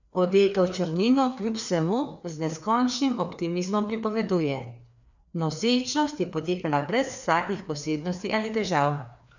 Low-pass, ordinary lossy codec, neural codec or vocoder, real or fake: 7.2 kHz; none; codec, 16 kHz, 2 kbps, FreqCodec, larger model; fake